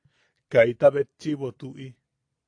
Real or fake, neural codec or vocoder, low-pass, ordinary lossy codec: real; none; 9.9 kHz; AAC, 48 kbps